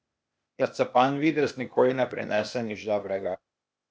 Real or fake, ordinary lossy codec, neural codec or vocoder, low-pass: fake; none; codec, 16 kHz, 0.8 kbps, ZipCodec; none